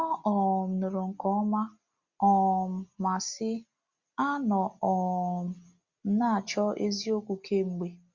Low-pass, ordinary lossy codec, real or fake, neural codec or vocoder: 7.2 kHz; Opus, 64 kbps; real; none